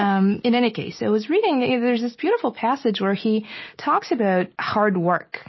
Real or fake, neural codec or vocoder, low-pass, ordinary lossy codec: real; none; 7.2 kHz; MP3, 24 kbps